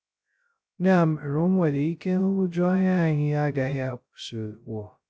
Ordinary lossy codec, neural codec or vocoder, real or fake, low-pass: none; codec, 16 kHz, 0.2 kbps, FocalCodec; fake; none